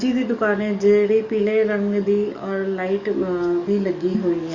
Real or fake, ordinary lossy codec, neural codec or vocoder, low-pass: fake; Opus, 64 kbps; codec, 44.1 kHz, 7.8 kbps, DAC; 7.2 kHz